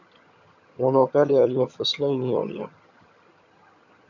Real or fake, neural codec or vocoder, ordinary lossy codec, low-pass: fake; vocoder, 22.05 kHz, 80 mel bands, HiFi-GAN; MP3, 64 kbps; 7.2 kHz